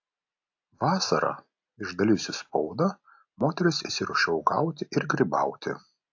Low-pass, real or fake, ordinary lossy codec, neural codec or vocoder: 7.2 kHz; real; AAC, 48 kbps; none